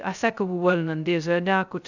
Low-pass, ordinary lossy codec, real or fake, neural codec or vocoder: 7.2 kHz; none; fake; codec, 16 kHz, 0.2 kbps, FocalCodec